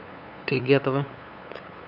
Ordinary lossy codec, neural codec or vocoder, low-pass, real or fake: none; codec, 16 kHz, 8 kbps, FunCodec, trained on LibriTTS, 25 frames a second; 5.4 kHz; fake